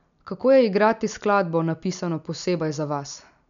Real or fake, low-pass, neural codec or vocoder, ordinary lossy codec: real; 7.2 kHz; none; none